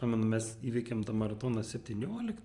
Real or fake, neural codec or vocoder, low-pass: fake; vocoder, 44.1 kHz, 128 mel bands every 512 samples, BigVGAN v2; 10.8 kHz